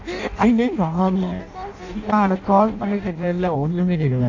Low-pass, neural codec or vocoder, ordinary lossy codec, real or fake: 7.2 kHz; codec, 16 kHz in and 24 kHz out, 0.6 kbps, FireRedTTS-2 codec; AAC, 48 kbps; fake